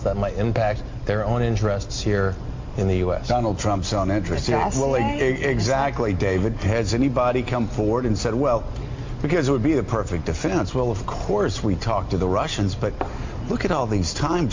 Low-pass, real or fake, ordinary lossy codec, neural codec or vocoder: 7.2 kHz; real; MP3, 48 kbps; none